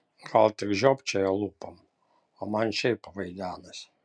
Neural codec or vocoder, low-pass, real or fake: none; 9.9 kHz; real